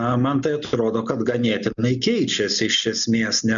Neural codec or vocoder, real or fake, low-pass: none; real; 7.2 kHz